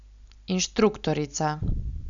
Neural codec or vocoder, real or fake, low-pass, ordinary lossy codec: none; real; 7.2 kHz; none